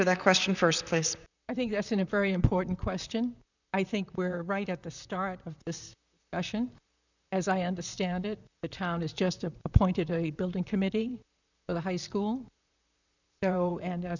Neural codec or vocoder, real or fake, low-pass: vocoder, 44.1 kHz, 128 mel bands, Pupu-Vocoder; fake; 7.2 kHz